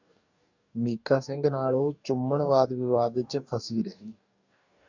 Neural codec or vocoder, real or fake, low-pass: codec, 44.1 kHz, 2.6 kbps, DAC; fake; 7.2 kHz